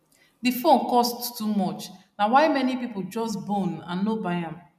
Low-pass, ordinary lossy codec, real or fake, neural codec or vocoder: 14.4 kHz; none; real; none